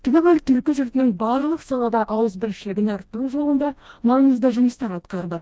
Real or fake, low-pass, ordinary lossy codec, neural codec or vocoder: fake; none; none; codec, 16 kHz, 1 kbps, FreqCodec, smaller model